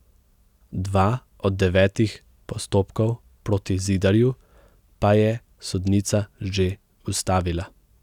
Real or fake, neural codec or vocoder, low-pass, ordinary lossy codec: real; none; 19.8 kHz; none